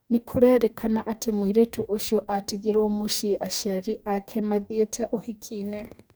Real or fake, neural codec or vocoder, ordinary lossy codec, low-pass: fake; codec, 44.1 kHz, 2.6 kbps, DAC; none; none